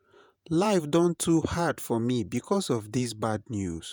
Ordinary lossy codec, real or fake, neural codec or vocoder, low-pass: none; fake; vocoder, 48 kHz, 128 mel bands, Vocos; none